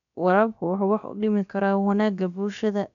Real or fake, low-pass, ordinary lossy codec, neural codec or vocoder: fake; 7.2 kHz; none; codec, 16 kHz, about 1 kbps, DyCAST, with the encoder's durations